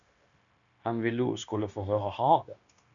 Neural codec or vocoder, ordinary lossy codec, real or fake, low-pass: codec, 16 kHz, 0.9 kbps, LongCat-Audio-Codec; MP3, 96 kbps; fake; 7.2 kHz